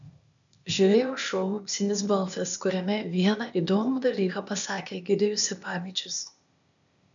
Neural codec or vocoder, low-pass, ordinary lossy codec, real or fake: codec, 16 kHz, 0.8 kbps, ZipCodec; 7.2 kHz; MP3, 96 kbps; fake